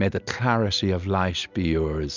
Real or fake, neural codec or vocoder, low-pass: real; none; 7.2 kHz